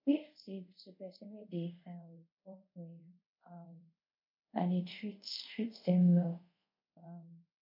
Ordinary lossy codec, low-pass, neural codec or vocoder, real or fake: MP3, 32 kbps; 5.4 kHz; codec, 24 kHz, 0.5 kbps, DualCodec; fake